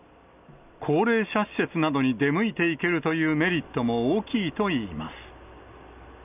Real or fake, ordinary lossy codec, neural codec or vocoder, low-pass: real; none; none; 3.6 kHz